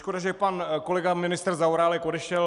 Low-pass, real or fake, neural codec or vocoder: 10.8 kHz; real; none